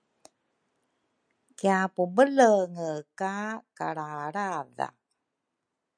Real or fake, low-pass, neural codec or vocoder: real; 9.9 kHz; none